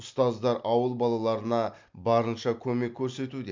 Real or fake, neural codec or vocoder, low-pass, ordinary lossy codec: real; none; 7.2 kHz; MP3, 64 kbps